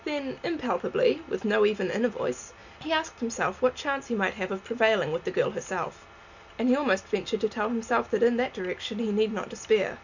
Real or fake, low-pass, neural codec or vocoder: real; 7.2 kHz; none